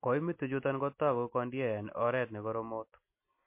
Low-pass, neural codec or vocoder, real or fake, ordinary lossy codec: 3.6 kHz; none; real; MP3, 24 kbps